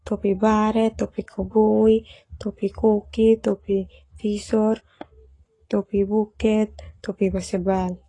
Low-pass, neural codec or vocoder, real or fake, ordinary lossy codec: 10.8 kHz; codec, 44.1 kHz, 7.8 kbps, Pupu-Codec; fake; AAC, 32 kbps